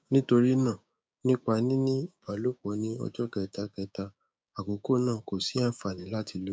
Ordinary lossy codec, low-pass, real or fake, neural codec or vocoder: none; none; fake; codec, 16 kHz, 6 kbps, DAC